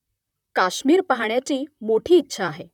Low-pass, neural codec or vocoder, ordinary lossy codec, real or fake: 19.8 kHz; vocoder, 44.1 kHz, 128 mel bands, Pupu-Vocoder; none; fake